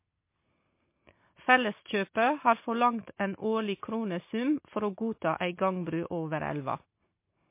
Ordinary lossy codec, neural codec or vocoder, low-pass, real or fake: MP3, 24 kbps; none; 3.6 kHz; real